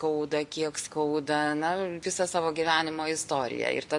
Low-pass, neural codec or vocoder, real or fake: 10.8 kHz; none; real